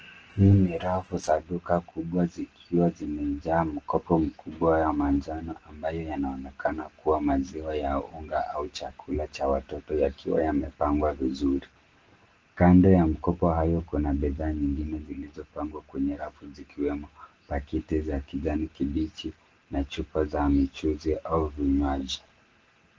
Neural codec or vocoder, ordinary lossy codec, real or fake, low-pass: none; Opus, 16 kbps; real; 7.2 kHz